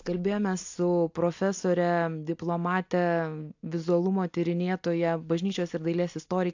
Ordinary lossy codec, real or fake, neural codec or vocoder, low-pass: AAC, 48 kbps; real; none; 7.2 kHz